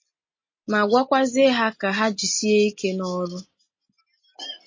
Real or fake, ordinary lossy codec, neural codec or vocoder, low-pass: real; MP3, 32 kbps; none; 7.2 kHz